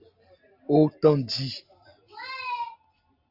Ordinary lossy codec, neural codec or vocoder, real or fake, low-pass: Opus, 64 kbps; none; real; 5.4 kHz